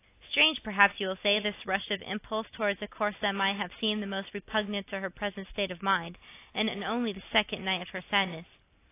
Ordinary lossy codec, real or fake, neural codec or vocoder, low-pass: AAC, 24 kbps; real; none; 3.6 kHz